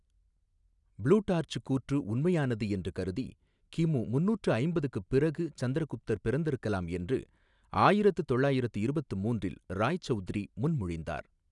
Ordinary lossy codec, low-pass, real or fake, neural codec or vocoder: none; 10.8 kHz; real; none